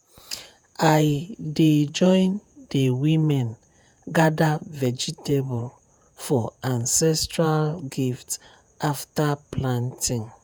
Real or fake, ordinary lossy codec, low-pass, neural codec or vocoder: fake; none; none; vocoder, 48 kHz, 128 mel bands, Vocos